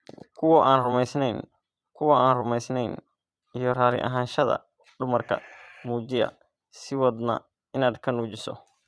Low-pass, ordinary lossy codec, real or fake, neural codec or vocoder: none; none; fake; vocoder, 22.05 kHz, 80 mel bands, Vocos